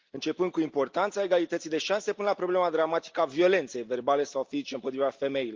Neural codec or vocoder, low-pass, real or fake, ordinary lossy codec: none; 7.2 kHz; real; Opus, 24 kbps